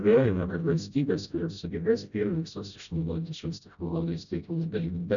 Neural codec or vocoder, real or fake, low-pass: codec, 16 kHz, 0.5 kbps, FreqCodec, smaller model; fake; 7.2 kHz